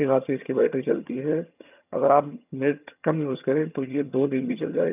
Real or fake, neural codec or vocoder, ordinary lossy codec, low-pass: fake; vocoder, 22.05 kHz, 80 mel bands, HiFi-GAN; none; 3.6 kHz